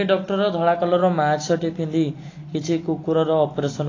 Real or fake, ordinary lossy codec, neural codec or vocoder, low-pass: real; AAC, 32 kbps; none; 7.2 kHz